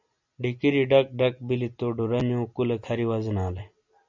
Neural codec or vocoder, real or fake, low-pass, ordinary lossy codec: none; real; 7.2 kHz; MP3, 64 kbps